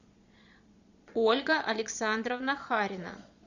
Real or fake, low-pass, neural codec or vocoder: fake; 7.2 kHz; vocoder, 22.05 kHz, 80 mel bands, Vocos